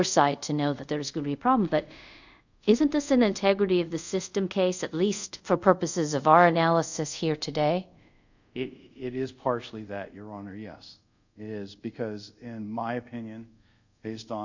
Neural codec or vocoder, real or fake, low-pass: codec, 24 kHz, 0.5 kbps, DualCodec; fake; 7.2 kHz